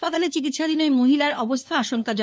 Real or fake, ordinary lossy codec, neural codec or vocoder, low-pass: fake; none; codec, 16 kHz, 2 kbps, FunCodec, trained on LibriTTS, 25 frames a second; none